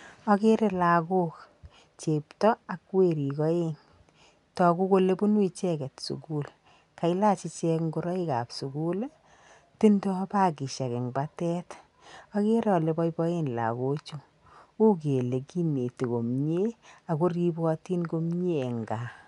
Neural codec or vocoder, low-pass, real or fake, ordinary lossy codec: none; 10.8 kHz; real; none